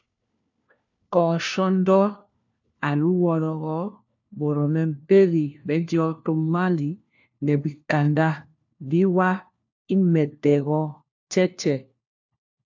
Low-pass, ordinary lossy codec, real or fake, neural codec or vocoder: 7.2 kHz; AAC, 48 kbps; fake; codec, 16 kHz, 1 kbps, FunCodec, trained on LibriTTS, 50 frames a second